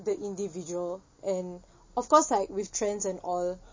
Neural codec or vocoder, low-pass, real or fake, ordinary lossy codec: none; 7.2 kHz; real; MP3, 32 kbps